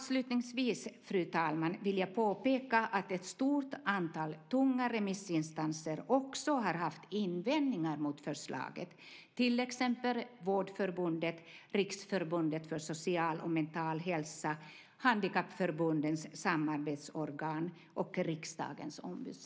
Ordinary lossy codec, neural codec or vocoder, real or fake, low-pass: none; none; real; none